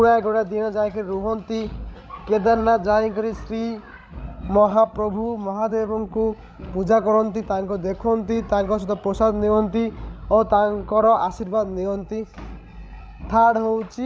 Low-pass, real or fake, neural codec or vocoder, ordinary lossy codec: none; fake; codec, 16 kHz, 16 kbps, FreqCodec, larger model; none